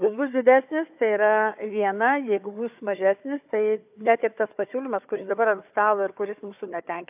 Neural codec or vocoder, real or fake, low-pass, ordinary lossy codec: codec, 16 kHz, 4 kbps, FunCodec, trained on Chinese and English, 50 frames a second; fake; 3.6 kHz; AAC, 32 kbps